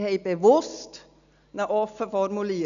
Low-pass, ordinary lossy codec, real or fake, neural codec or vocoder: 7.2 kHz; none; real; none